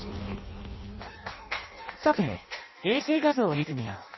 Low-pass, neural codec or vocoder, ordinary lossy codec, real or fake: 7.2 kHz; codec, 16 kHz in and 24 kHz out, 0.6 kbps, FireRedTTS-2 codec; MP3, 24 kbps; fake